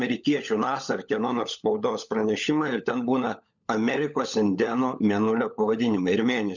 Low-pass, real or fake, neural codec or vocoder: 7.2 kHz; fake; codec, 16 kHz, 16 kbps, FunCodec, trained on LibriTTS, 50 frames a second